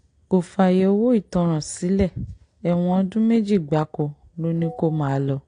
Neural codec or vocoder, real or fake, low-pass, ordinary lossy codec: none; real; 9.9 kHz; AAC, 48 kbps